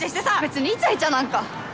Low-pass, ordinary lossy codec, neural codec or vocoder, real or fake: none; none; none; real